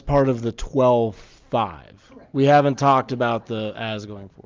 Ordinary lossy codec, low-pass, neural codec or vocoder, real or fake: Opus, 32 kbps; 7.2 kHz; none; real